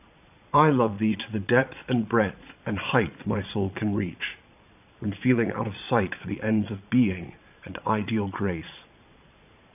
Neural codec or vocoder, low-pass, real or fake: vocoder, 22.05 kHz, 80 mel bands, Vocos; 3.6 kHz; fake